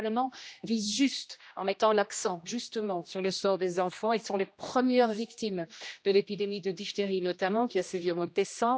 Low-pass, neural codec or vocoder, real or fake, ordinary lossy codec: none; codec, 16 kHz, 1 kbps, X-Codec, HuBERT features, trained on general audio; fake; none